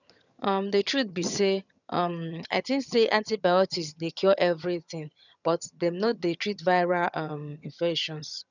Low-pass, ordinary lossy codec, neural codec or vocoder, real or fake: 7.2 kHz; none; vocoder, 22.05 kHz, 80 mel bands, HiFi-GAN; fake